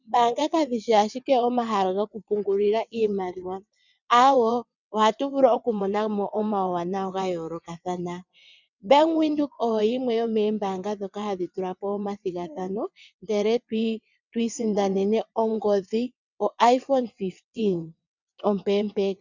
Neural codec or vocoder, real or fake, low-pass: vocoder, 22.05 kHz, 80 mel bands, WaveNeXt; fake; 7.2 kHz